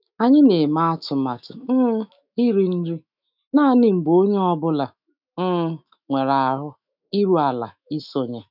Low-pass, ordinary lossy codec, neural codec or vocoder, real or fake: 5.4 kHz; none; autoencoder, 48 kHz, 128 numbers a frame, DAC-VAE, trained on Japanese speech; fake